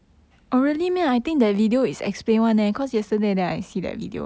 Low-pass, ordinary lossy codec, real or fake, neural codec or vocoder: none; none; real; none